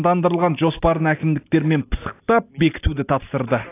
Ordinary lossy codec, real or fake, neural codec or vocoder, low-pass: AAC, 24 kbps; real; none; 3.6 kHz